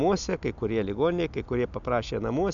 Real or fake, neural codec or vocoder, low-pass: real; none; 7.2 kHz